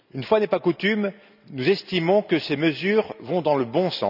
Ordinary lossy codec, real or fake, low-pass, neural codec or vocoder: none; real; 5.4 kHz; none